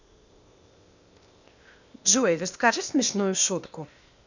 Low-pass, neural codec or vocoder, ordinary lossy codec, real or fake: 7.2 kHz; codec, 16 kHz, 0.8 kbps, ZipCodec; none; fake